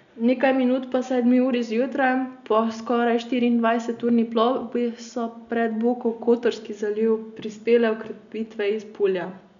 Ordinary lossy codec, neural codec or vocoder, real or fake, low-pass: none; none; real; 7.2 kHz